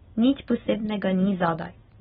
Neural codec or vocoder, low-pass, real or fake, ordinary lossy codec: none; 7.2 kHz; real; AAC, 16 kbps